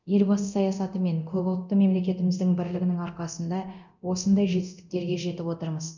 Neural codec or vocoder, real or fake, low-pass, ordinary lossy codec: codec, 24 kHz, 0.9 kbps, DualCodec; fake; 7.2 kHz; none